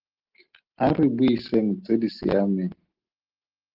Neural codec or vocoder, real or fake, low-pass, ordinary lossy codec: none; real; 5.4 kHz; Opus, 16 kbps